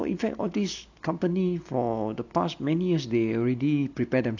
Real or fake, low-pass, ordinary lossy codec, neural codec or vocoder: real; 7.2 kHz; none; none